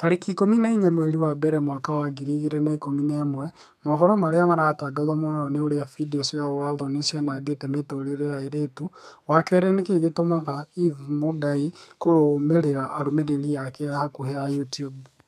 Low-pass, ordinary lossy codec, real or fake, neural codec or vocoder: 14.4 kHz; none; fake; codec, 32 kHz, 1.9 kbps, SNAC